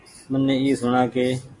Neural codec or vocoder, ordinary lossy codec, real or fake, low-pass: none; AAC, 48 kbps; real; 10.8 kHz